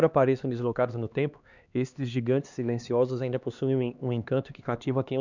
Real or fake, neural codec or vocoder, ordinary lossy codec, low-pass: fake; codec, 16 kHz, 2 kbps, X-Codec, HuBERT features, trained on LibriSpeech; none; 7.2 kHz